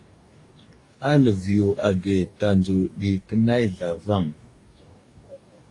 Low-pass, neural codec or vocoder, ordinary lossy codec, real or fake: 10.8 kHz; codec, 44.1 kHz, 2.6 kbps, DAC; AAC, 48 kbps; fake